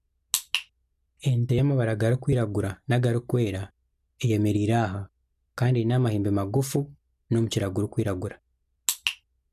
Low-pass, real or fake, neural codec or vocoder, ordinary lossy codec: 14.4 kHz; real; none; none